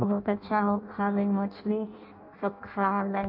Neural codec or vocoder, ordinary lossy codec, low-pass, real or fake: codec, 16 kHz in and 24 kHz out, 0.6 kbps, FireRedTTS-2 codec; MP3, 48 kbps; 5.4 kHz; fake